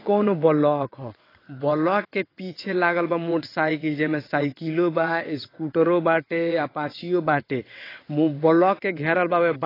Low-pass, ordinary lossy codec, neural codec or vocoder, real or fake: 5.4 kHz; AAC, 24 kbps; vocoder, 22.05 kHz, 80 mel bands, WaveNeXt; fake